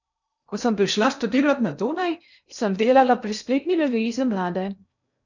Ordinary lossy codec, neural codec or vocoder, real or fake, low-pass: none; codec, 16 kHz in and 24 kHz out, 0.6 kbps, FocalCodec, streaming, 2048 codes; fake; 7.2 kHz